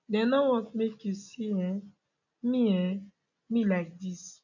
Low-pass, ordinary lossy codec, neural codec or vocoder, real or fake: 7.2 kHz; none; none; real